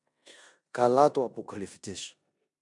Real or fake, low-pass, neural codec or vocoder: fake; 10.8 kHz; codec, 16 kHz in and 24 kHz out, 0.9 kbps, LongCat-Audio-Codec, four codebook decoder